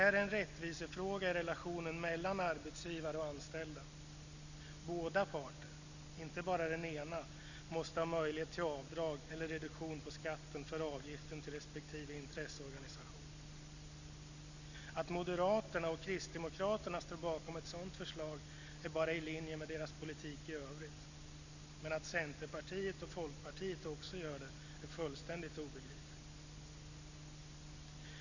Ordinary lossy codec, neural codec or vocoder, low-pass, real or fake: none; none; 7.2 kHz; real